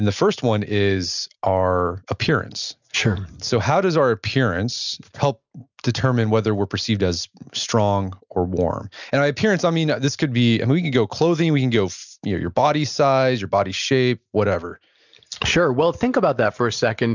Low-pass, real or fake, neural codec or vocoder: 7.2 kHz; real; none